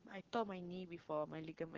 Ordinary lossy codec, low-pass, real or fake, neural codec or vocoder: Opus, 16 kbps; 7.2 kHz; fake; codec, 44.1 kHz, 7.8 kbps, Pupu-Codec